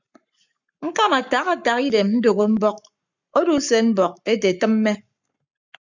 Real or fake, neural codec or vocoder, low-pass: fake; codec, 44.1 kHz, 7.8 kbps, Pupu-Codec; 7.2 kHz